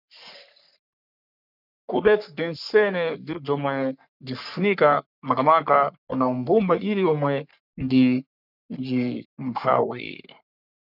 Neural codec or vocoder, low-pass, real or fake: codec, 44.1 kHz, 3.4 kbps, Pupu-Codec; 5.4 kHz; fake